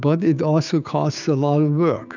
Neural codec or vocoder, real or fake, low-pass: codec, 16 kHz, 6 kbps, DAC; fake; 7.2 kHz